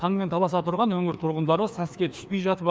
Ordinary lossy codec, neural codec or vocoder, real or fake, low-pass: none; codec, 16 kHz, 2 kbps, FreqCodec, larger model; fake; none